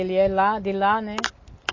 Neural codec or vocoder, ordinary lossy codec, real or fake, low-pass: none; MP3, 32 kbps; real; 7.2 kHz